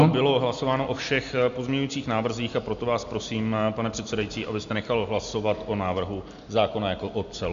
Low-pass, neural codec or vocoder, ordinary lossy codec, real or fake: 7.2 kHz; none; MP3, 64 kbps; real